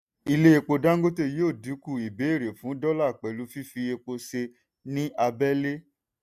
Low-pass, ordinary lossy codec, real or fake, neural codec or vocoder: 14.4 kHz; none; real; none